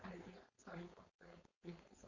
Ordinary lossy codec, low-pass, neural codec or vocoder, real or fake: Opus, 64 kbps; 7.2 kHz; codec, 16 kHz, 4.8 kbps, FACodec; fake